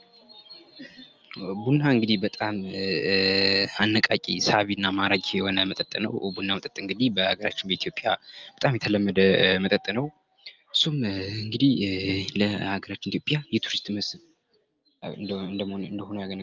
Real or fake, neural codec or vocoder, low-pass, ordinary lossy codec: real; none; 7.2 kHz; Opus, 24 kbps